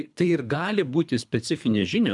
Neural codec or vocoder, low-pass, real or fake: codec, 24 kHz, 3 kbps, HILCodec; 10.8 kHz; fake